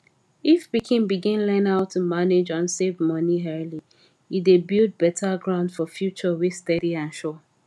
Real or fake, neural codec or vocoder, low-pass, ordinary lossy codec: real; none; none; none